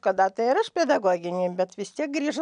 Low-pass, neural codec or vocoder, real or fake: 9.9 kHz; none; real